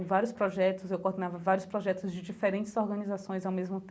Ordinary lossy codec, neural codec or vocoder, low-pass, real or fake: none; none; none; real